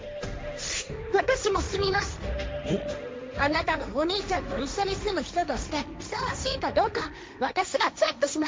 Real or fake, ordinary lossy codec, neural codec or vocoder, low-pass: fake; none; codec, 16 kHz, 1.1 kbps, Voila-Tokenizer; none